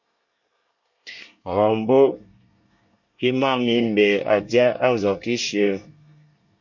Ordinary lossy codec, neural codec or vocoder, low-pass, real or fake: MP3, 48 kbps; codec, 24 kHz, 1 kbps, SNAC; 7.2 kHz; fake